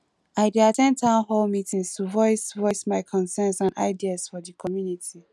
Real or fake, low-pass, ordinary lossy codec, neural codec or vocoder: real; none; none; none